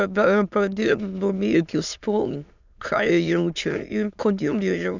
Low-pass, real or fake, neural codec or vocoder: 7.2 kHz; fake; autoencoder, 22.05 kHz, a latent of 192 numbers a frame, VITS, trained on many speakers